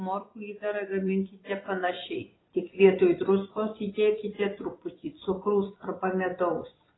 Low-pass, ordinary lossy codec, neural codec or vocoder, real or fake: 7.2 kHz; AAC, 16 kbps; vocoder, 24 kHz, 100 mel bands, Vocos; fake